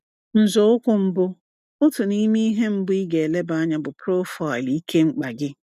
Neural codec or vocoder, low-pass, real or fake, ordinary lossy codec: none; 14.4 kHz; real; none